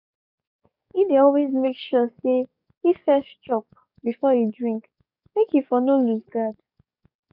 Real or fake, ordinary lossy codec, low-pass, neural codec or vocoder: fake; none; 5.4 kHz; codec, 44.1 kHz, 7.8 kbps, DAC